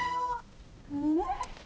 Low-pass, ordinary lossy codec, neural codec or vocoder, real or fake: none; none; codec, 16 kHz, 0.5 kbps, X-Codec, HuBERT features, trained on balanced general audio; fake